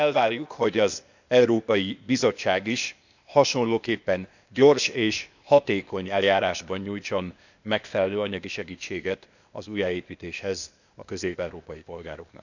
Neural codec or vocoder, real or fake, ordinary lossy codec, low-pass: codec, 16 kHz, 0.8 kbps, ZipCodec; fake; none; 7.2 kHz